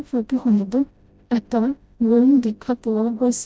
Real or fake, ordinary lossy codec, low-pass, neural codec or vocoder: fake; none; none; codec, 16 kHz, 0.5 kbps, FreqCodec, smaller model